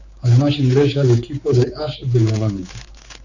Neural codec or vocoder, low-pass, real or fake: codec, 16 kHz, 4 kbps, X-Codec, HuBERT features, trained on general audio; 7.2 kHz; fake